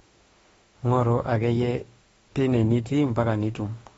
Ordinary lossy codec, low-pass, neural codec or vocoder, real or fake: AAC, 24 kbps; 19.8 kHz; autoencoder, 48 kHz, 32 numbers a frame, DAC-VAE, trained on Japanese speech; fake